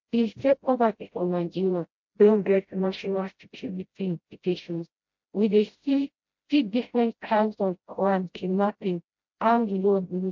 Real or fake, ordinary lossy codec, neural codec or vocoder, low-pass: fake; MP3, 48 kbps; codec, 16 kHz, 0.5 kbps, FreqCodec, smaller model; 7.2 kHz